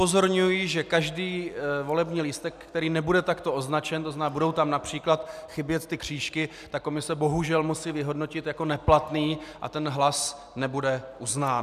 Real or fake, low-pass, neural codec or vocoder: real; 14.4 kHz; none